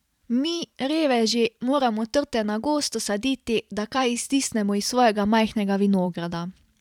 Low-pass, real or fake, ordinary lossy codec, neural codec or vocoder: 19.8 kHz; fake; none; vocoder, 44.1 kHz, 128 mel bands every 512 samples, BigVGAN v2